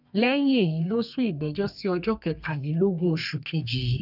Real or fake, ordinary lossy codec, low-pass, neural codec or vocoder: fake; none; 5.4 kHz; codec, 32 kHz, 1.9 kbps, SNAC